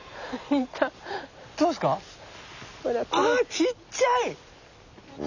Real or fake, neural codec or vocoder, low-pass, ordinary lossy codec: real; none; 7.2 kHz; none